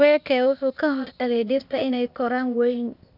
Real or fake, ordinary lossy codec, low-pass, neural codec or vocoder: fake; none; 5.4 kHz; codec, 16 kHz, 0.8 kbps, ZipCodec